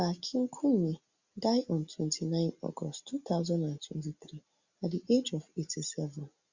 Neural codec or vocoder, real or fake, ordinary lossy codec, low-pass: none; real; Opus, 64 kbps; 7.2 kHz